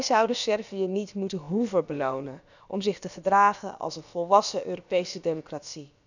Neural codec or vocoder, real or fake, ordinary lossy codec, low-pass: codec, 16 kHz, about 1 kbps, DyCAST, with the encoder's durations; fake; none; 7.2 kHz